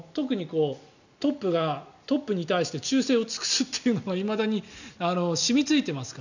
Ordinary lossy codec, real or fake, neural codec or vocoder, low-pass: none; real; none; 7.2 kHz